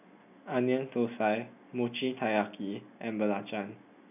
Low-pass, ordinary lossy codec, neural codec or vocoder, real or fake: 3.6 kHz; none; none; real